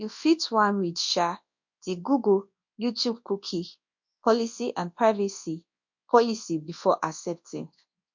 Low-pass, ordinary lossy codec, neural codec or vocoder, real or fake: 7.2 kHz; MP3, 48 kbps; codec, 24 kHz, 0.9 kbps, WavTokenizer, large speech release; fake